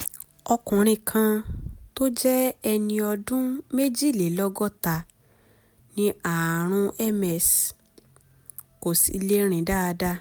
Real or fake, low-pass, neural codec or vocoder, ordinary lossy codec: real; none; none; none